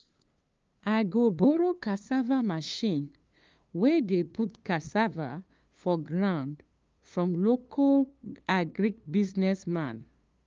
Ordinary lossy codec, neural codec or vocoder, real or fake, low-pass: Opus, 24 kbps; codec, 16 kHz, 2 kbps, FunCodec, trained on LibriTTS, 25 frames a second; fake; 7.2 kHz